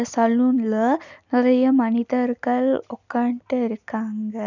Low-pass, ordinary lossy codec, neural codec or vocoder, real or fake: 7.2 kHz; none; none; real